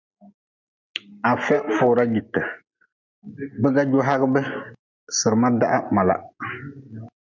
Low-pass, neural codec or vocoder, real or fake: 7.2 kHz; none; real